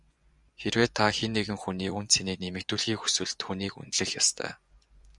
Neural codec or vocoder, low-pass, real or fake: vocoder, 24 kHz, 100 mel bands, Vocos; 10.8 kHz; fake